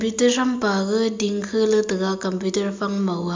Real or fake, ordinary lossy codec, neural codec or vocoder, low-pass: real; MP3, 64 kbps; none; 7.2 kHz